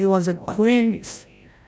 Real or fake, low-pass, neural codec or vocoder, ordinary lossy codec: fake; none; codec, 16 kHz, 0.5 kbps, FreqCodec, larger model; none